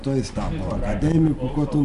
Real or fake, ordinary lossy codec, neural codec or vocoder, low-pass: real; MP3, 64 kbps; none; 10.8 kHz